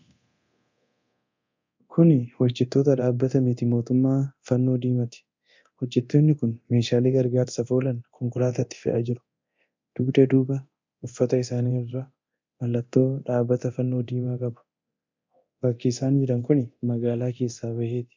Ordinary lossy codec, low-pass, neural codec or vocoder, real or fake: MP3, 64 kbps; 7.2 kHz; codec, 24 kHz, 0.9 kbps, DualCodec; fake